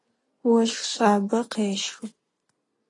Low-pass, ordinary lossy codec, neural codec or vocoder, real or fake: 10.8 kHz; AAC, 32 kbps; none; real